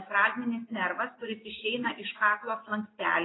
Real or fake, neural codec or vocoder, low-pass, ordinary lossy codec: real; none; 7.2 kHz; AAC, 16 kbps